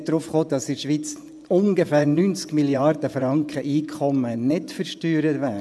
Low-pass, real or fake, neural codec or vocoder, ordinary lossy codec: none; fake; vocoder, 24 kHz, 100 mel bands, Vocos; none